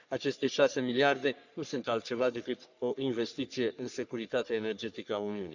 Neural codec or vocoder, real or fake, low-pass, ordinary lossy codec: codec, 44.1 kHz, 3.4 kbps, Pupu-Codec; fake; 7.2 kHz; none